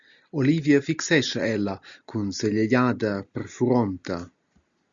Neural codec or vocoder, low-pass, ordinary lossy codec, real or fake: none; 7.2 kHz; Opus, 64 kbps; real